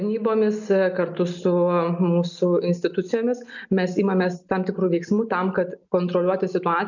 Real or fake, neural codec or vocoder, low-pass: real; none; 7.2 kHz